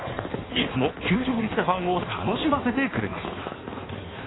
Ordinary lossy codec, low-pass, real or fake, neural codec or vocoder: AAC, 16 kbps; 7.2 kHz; fake; codec, 16 kHz in and 24 kHz out, 1.1 kbps, FireRedTTS-2 codec